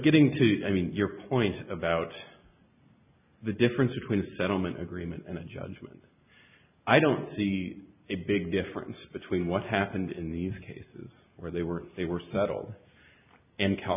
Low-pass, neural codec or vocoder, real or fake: 3.6 kHz; none; real